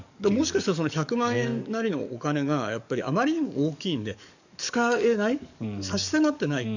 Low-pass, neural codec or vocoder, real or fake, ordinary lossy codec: 7.2 kHz; codec, 44.1 kHz, 7.8 kbps, DAC; fake; none